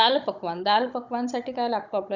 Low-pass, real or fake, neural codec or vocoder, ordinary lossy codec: 7.2 kHz; fake; codec, 16 kHz, 16 kbps, FunCodec, trained on Chinese and English, 50 frames a second; none